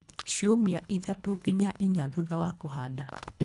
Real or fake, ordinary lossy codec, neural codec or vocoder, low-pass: fake; none; codec, 24 kHz, 1.5 kbps, HILCodec; 10.8 kHz